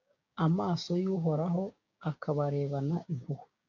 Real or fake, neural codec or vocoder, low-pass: fake; codec, 16 kHz, 6 kbps, DAC; 7.2 kHz